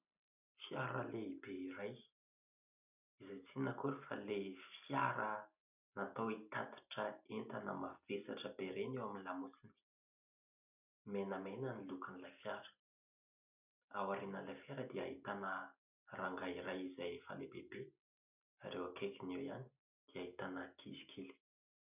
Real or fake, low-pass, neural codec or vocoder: real; 3.6 kHz; none